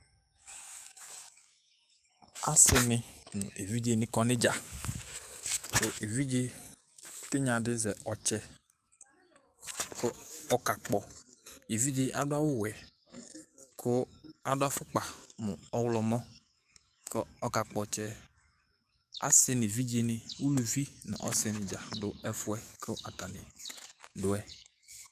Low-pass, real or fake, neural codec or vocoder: 14.4 kHz; fake; codec, 44.1 kHz, 7.8 kbps, DAC